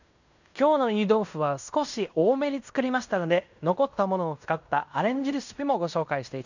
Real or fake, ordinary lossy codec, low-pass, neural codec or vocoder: fake; none; 7.2 kHz; codec, 16 kHz in and 24 kHz out, 0.9 kbps, LongCat-Audio-Codec, fine tuned four codebook decoder